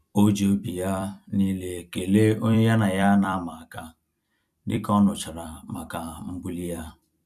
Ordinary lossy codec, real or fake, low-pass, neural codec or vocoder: none; fake; 14.4 kHz; vocoder, 44.1 kHz, 128 mel bands every 256 samples, BigVGAN v2